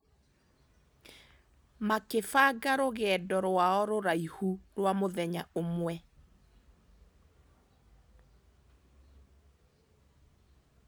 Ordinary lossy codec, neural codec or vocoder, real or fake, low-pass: none; none; real; none